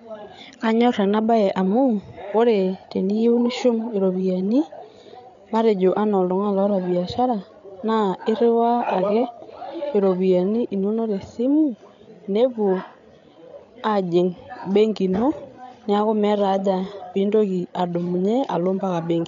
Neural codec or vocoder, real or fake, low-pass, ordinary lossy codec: codec, 16 kHz, 8 kbps, FreqCodec, larger model; fake; 7.2 kHz; none